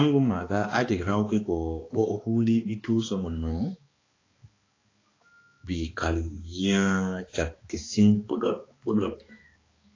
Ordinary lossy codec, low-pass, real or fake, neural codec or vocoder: AAC, 32 kbps; 7.2 kHz; fake; codec, 16 kHz, 2 kbps, X-Codec, HuBERT features, trained on balanced general audio